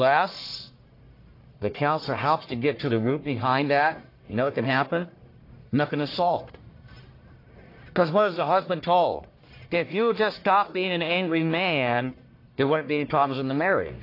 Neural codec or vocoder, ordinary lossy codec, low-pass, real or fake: codec, 44.1 kHz, 1.7 kbps, Pupu-Codec; AAC, 32 kbps; 5.4 kHz; fake